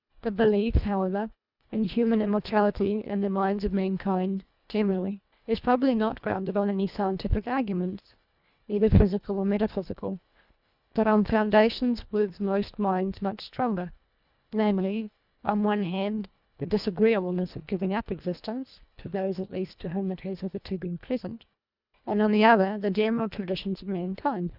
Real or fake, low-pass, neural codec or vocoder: fake; 5.4 kHz; codec, 24 kHz, 1.5 kbps, HILCodec